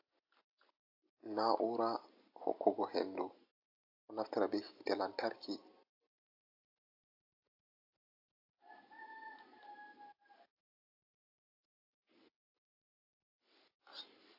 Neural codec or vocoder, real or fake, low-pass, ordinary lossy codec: none; real; 5.4 kHz; AAC, 48 kbps